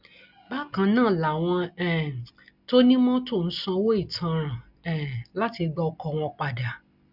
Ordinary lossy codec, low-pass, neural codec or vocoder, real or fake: none; 5.4 kHz; none; real